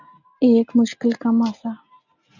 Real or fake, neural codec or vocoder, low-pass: real; none; 7.2 kHz